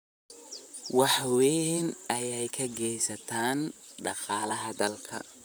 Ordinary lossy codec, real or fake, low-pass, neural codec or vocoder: none; fake; none; vocoder, 44.1 kHz, 128 mel bands, Pupu-Vocoder